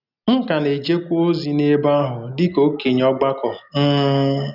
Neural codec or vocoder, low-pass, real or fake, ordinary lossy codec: none; 5.4 kHz; real; none